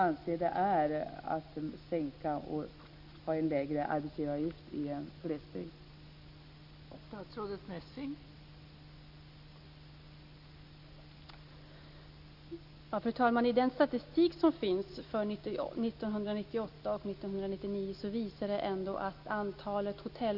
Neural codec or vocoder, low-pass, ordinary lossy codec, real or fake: none; 5.4 kHz; Opus, 64 kbps; real